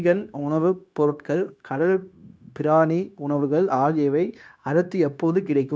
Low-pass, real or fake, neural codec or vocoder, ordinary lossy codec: none; fake; codec, 16 kHz, 0.9 kbps, LongCat-Audio-Codec; none